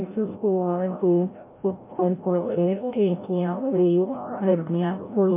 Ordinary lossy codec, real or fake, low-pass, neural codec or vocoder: MP3, 24 kbps; fake; 3.6 kHz; codec, 16 kHz, 0.5 kbps, FreqCodec, larger model